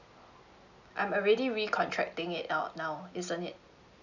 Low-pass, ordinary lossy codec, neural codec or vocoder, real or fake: 7.2 kHz; none; none; real